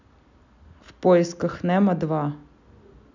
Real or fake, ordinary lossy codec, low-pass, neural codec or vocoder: real; none; 7.2 kHz; none